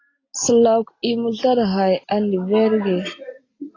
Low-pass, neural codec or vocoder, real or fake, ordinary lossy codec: 7.2 kHz; none; real; AAC, 32 kbps